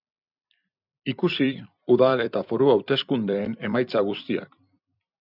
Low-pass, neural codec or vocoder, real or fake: 5.4 kHz; vocoder, 44.1 kHz, 128 mel bands every 256 samples, BigVGAN v2; fake